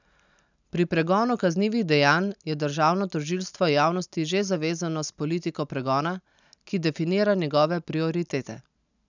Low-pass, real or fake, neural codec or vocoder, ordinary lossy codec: 7.2 kHz; real; none; none